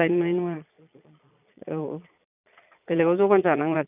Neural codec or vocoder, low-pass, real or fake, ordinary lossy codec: vocoder, 22.05 kHz, 80 mel bands, Vocos; 3.6 kHz; fake; none